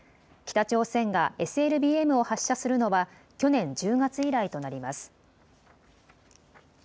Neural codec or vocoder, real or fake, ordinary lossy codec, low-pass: none; real; none; none